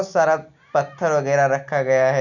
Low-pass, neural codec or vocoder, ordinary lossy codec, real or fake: 7.2 kHz; none; none; real